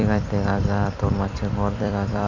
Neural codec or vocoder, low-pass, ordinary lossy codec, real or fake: vocoder, 44.1 kHz, 128 mel bands every 256 samples, BigVGAN v2; 7.2 kHz; none; fake